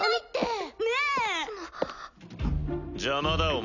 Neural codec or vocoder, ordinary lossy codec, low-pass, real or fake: none; none; 7.2 kHz; real